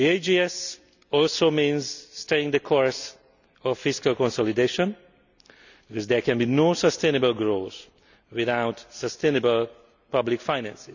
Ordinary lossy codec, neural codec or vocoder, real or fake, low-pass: none; none; real; 7.2 kHz